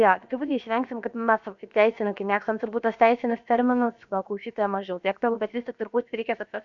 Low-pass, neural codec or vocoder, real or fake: 7.2 kHz; codec, 16 kHz, about 1 kbps, DyCAST, with the encoder's durations; fake